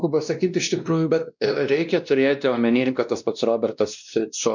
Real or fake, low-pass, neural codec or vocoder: fake; 7.2 kHz; codec, 16 kHz, 1 kbps, X-Codec, WavLM features, trained on Multilingual LibriSpeech